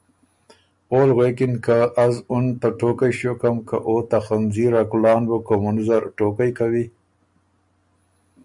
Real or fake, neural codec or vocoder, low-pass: real; none; 10.8 kHz